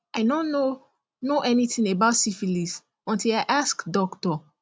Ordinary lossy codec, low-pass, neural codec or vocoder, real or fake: none; none; none; real